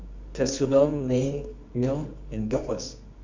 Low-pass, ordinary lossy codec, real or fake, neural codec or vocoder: 7.2 kHz; MP3, 64 kbps; fake; codec, 24 kHz, 0.9 kbps, WavTokenizer, medium music audio release